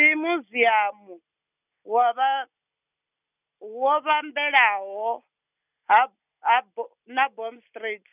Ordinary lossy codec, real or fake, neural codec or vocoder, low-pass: none; real; none; 3.6 kHz